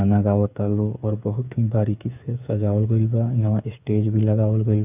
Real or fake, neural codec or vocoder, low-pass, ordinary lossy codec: fake; codec, 16 kHz, 8 kbps, FreqCodec, smaller model; 3.6 kHz; none